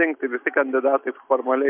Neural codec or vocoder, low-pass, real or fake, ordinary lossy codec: none; 3.6 kHz; real; MP3, 32 kbps